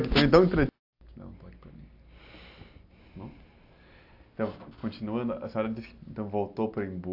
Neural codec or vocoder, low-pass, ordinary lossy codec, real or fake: none; 5.4 kHz; AAC, 48 kbps; real